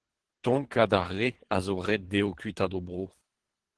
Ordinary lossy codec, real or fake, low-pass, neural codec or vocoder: Opus, 16 kbps; fake; 10.8 kHz; codec, 24 kHz, 3 kbps, HILCodec